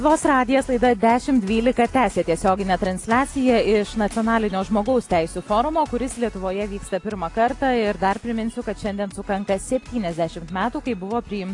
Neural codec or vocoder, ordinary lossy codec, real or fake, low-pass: none; AAC, 48 kbps; real; 10.8 kHz